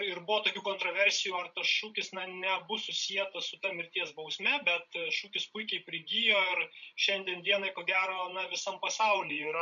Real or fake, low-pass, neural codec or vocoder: fake; 7.2 kHz; codec, 16 kHz, 16 kbps, FreqCodec, larger model